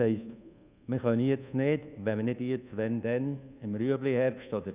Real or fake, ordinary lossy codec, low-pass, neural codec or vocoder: fake; Opus, 64 kbps; 3.6 kHz; codec, 24 kHz, 1.2 kbps, DualCodec